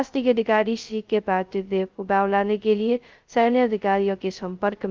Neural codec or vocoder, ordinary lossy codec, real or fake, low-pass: codec, 16 kHz, 0.2 kbps, FocalCodec; Opus, 32 kbps; fake; 7.2 kHz